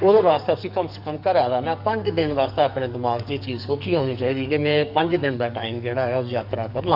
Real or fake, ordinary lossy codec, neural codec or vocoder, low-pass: fake; none; codec, 44.1 kHz, 2.6 kbps, SNAC; 5.4 kHz